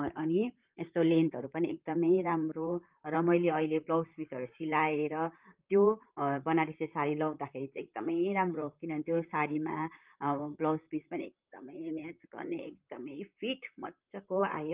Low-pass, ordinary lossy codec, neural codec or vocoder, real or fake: 3.6 kHz; Opus, 24 kbps; vocoder, 44.1 kHz, 128 mel bands, Pupu-Vocoder; fake